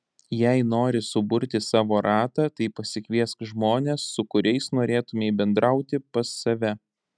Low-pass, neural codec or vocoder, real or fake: 9.9 kHz; none; real